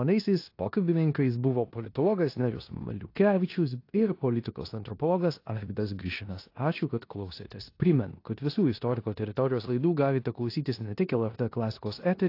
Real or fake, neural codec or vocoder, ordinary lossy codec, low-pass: fake; codec, 16 kHz in and 24 kHz out, 0.9 kbps, LongCat-Audio-Codec, four codebook decoder; AAC, 32 kbps; 5.4 kHz